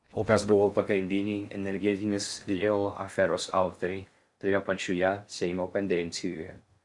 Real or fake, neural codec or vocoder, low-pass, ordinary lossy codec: fake; codec, 16 kHz in and 24 kHz out, 0.6 kbps, FocalCodec, streaming, 2048 codes; 10.8 kHz; Opus, 64 kbps